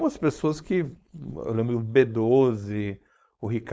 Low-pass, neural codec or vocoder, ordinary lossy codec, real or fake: none; codec, 16 kHz, 4.8 kbps, FACodec; none; fake